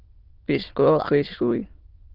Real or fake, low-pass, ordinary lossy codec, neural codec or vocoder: fake; 5.4 kHz; Opus, 16 kbps; autoencoder, 22.05 kHz, a latent of 192 numbers a frame, VITS, trained on many speakers